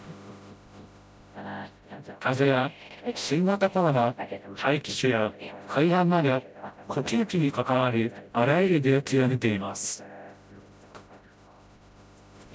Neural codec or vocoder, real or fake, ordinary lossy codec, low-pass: codec, 16 kHz, 0.5 kbps, FreqCodec, smaller model; fake; none; none